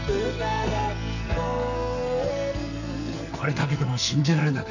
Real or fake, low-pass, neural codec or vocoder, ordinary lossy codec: fake; 7.2 kHz; codec, 44.1 kHz, 2.6 kbps, SNAC; none